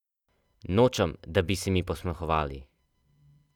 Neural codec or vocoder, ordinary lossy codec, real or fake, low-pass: none; none; real; 19.8 kHz